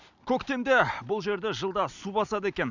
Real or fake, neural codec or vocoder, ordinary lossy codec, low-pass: real; none; none; 7.2 kHz